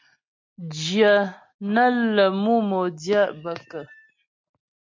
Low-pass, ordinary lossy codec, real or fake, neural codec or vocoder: 7.2 kHz; MP3, 48 kbps; real; none